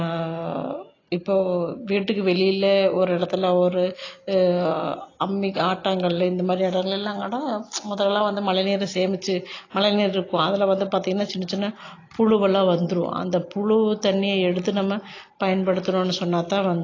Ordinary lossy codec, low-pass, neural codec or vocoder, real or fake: AAC, 32 kbps; 7.2 kHz; none; real